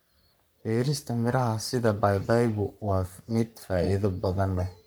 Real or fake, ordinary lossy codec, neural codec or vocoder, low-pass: fake; none; codec, 44.1 kHz, 3.4 kbps, Pupu-Codec; none